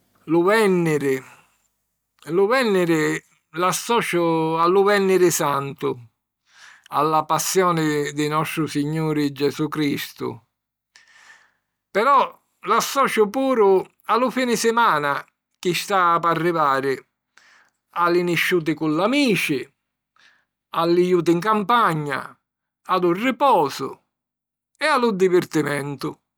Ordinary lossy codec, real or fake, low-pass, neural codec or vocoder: none; real; none; none